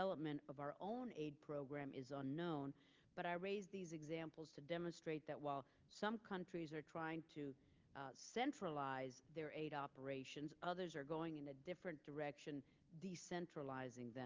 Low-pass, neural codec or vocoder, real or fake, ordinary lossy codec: 7.2 kHz; none; real; Opus, 32 kbps